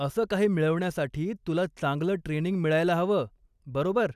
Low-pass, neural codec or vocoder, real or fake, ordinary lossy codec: 14.4 kHz; none; real; none